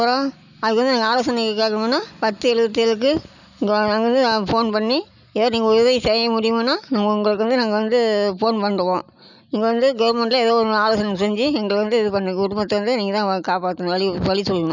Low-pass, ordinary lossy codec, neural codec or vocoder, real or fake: 7.2 kHz; none; none; real